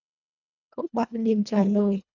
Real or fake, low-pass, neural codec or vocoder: fake; 7.2 kHz; codec, 24 kHz, 1.5 kbps, HILCodec